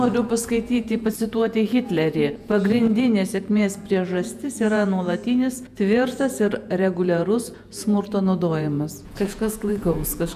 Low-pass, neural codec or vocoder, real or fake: 14.4 kHz; vocoder, 48 kHz, 128 mel bands, Vocos; fake